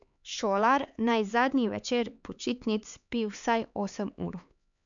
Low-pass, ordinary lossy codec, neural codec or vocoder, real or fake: 7.2 kHz; none; codec, 16 kHz, 4 kbps, X-Codec, HuBERT features, trained on LibriSpeech; fake